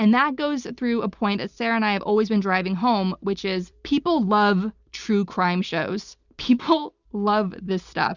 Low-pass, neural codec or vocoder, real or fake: 7.2 kHz; none; real